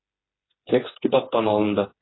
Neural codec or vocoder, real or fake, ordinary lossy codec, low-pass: codec, 16 kHz, 4 kbps, FreqCodec, smaller model; fake; AAC, 16 kbps; 7.2 kHz